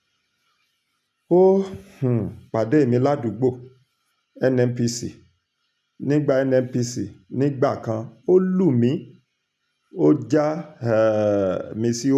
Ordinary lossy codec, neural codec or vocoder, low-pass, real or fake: none; none; 14.4 kHz; real